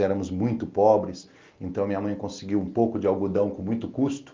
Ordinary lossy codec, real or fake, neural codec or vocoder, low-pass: Opus, 32 kbps; real; none; 7.2 kHz